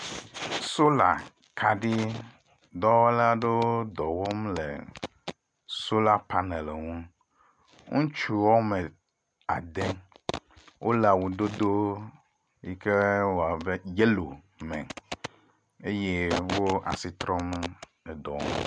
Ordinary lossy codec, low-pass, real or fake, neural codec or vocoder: MP3, 96 kbps; 9.9 kHz; real; none